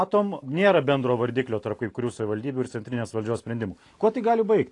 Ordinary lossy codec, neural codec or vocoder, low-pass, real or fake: AAC, 48 kbps; none; 10.8 kHz; real